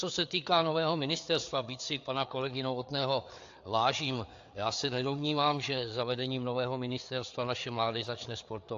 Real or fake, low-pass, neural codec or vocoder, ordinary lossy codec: fake; 7.2 kHz; codec, 16 kHz, 4 kbps, FreqCodec, larger model; AAC, 64 kbps